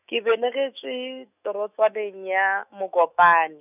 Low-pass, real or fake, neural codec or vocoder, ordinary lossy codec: 3.6 kHz; fake; autoencoder, 48 kHz, 128 numbers a frame, DAC-VAE, trained on Japanese speech; none